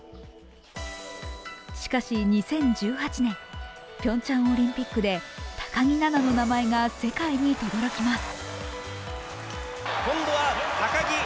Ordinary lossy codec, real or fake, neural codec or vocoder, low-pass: none; real; none; none